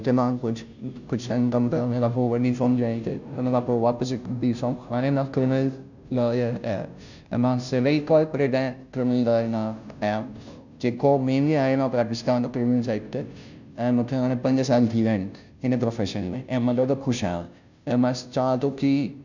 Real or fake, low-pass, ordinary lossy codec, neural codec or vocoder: fake; 7.2 kHz; none; codec, 16 kHz, 0.5 kbps, FunCodec, trained on Chinese and English, 25 frames a second